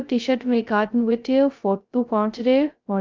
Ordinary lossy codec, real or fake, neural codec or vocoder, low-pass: Opus, 24 kbps; fake; codec, 16 kHz, 0.2 kbps, FocalCodec; 7.2 kHz